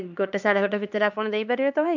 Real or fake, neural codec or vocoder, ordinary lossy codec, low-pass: fake; codec, 16 kHz, 2 kbps, X-Codec, HuBERT features, trained on LibriSpeech; none; 7.2 kHz